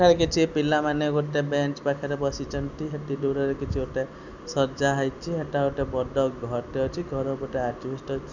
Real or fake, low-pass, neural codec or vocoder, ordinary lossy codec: real; 7.2 kHz; none; none